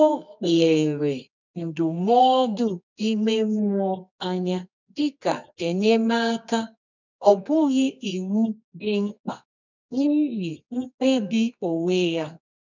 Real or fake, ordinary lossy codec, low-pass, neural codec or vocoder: fake; none; 7.2 kHz; codec, 24 kHz, 0.9 kbps, WavTokenizer, medium music audio release